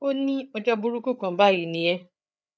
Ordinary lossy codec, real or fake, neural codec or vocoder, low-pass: none; fake; codec, 16 kHz, 8 kbps, FreqCodec, larger model; none